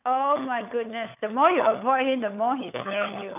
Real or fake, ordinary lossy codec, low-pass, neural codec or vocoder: fake; none; 3.6 kHz; codec, 16 kHz, 16 kbps, FunCodec, trained on LibriTTS, 50 frames a second